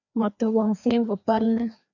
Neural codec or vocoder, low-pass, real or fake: codec, 16 kHz, 2 kbps, FreqCodec, larger model; 7.2 kHz; fake